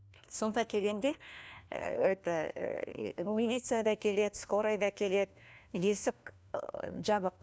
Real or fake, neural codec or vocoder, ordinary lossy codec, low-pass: fake; codec, 16 kHz, 1 kbps, FunCodec, trained on LibriTTS, 50 frames a second; none; none